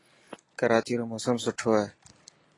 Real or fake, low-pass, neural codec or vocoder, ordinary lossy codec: real; 10.8 kHz; none; AAC, 32 kbps